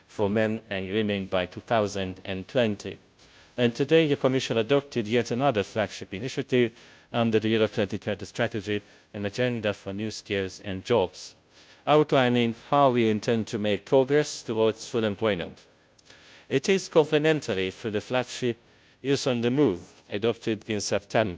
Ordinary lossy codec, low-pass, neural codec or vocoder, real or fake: none; none; codec, 16 kHz, 0.5 kbps, FunCodec, trained on Chinese and English, 25 frames a second; fake